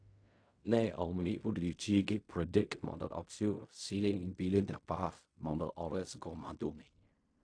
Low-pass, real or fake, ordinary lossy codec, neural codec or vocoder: 9.9 kHz; fake; AAC, 48 kbps; codec, 16 kHz in and 24 kHz out, 0.4 kbps, LongCat-Audio-Codec, fine tuned four codebook decoder